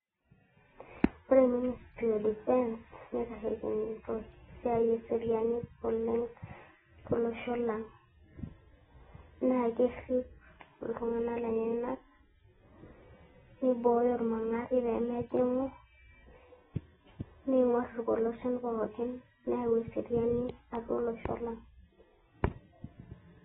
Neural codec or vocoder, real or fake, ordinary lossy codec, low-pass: none; real; AAC, 16 kbps; 19.8 kHz